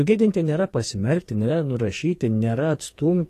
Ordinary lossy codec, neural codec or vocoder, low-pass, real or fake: AAC, 48 kbps; codec, 44.1 kHz, 2.6 kbps, SNAC; 14.4 kHz; fake